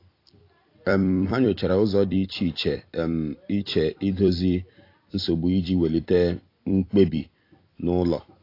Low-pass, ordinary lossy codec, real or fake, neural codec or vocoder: 5.4 kHz; AAC, 32 kbps; fake; vocoder, 44.1 kHz, 128 mel bands every 256 samples, BigVGAN v2